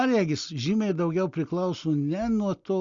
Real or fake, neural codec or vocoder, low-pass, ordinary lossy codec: real; none; 7.2 kHz; Opus, 64 kbps